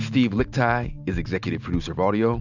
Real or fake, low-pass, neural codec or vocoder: real; 7.2 kHz; none